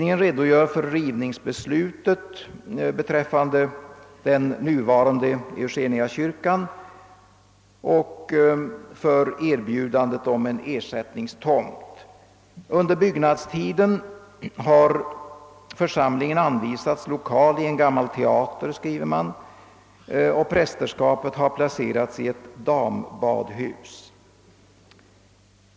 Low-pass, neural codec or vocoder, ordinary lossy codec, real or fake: none; none; none; real